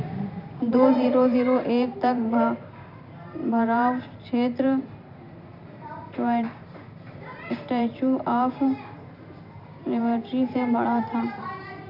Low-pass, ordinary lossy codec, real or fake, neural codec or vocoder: 5.4 kHz; none; fake; vocoder, 44.1 kHz, 128 mel bands every 512 samples, BigVGAN v2